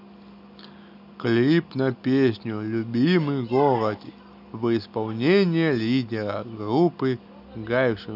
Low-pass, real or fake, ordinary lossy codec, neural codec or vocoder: 5.4 kHz; real; none; none